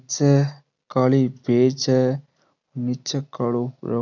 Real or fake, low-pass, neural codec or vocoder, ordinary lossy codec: real; 7.2 kHz; none; none